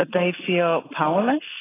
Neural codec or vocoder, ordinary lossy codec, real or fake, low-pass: none; AAC, 16 kbps; real; 3.6 kHz